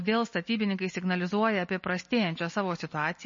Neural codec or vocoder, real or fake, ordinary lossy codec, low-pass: none; real; MP3, 32 kbps; 7.2 kHz